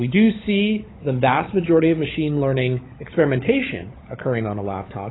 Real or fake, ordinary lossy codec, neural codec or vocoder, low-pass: fake; AAC, 16 kbps; codec, 16 kHz, 16 kbps, FunCodec, trained on LibriTTS, 50 frames a second; 7.2 kHz